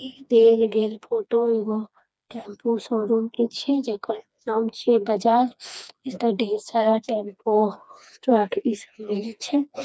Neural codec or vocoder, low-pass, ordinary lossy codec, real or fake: codec, 16 kHz, 2 kbps, FreqCodec, smaller model; none; none; fake